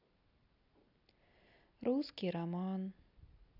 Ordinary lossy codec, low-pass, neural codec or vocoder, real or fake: none; 5.4 kHz; none; real